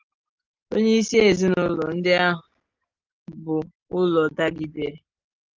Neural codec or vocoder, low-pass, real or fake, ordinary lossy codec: none; 7.2 kHz; real; Opus, 24 kbps